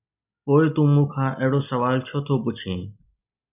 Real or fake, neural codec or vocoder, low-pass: real; none; 3.6 kHz